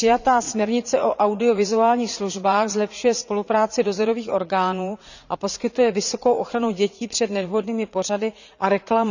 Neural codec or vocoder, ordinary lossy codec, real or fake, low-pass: vocoder, 44.1 kHz, 80 mel bands, Vocos; none; fake; 7.2 kHz